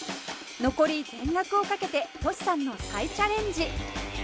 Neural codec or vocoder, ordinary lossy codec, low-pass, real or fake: none; none; none; real